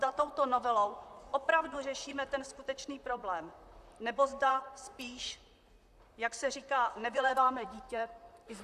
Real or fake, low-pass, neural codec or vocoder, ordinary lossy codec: fake; 14.4 kHz; vocoder, 44.1 kHz, 128 mel bands, Pupu-Vocoder; AAC, 96 kbps